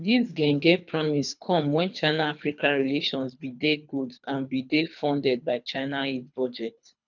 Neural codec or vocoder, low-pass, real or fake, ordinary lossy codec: codec, 24 kHz, 3 kbps, HILCodec; 7.2 kHz; fake; none